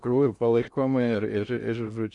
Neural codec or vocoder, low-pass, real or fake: codec, 16 kHz in and 24 kHz out, 0.6 kbps, FocalCodec, streaming, 2048 codes; 10.8 kHz; fake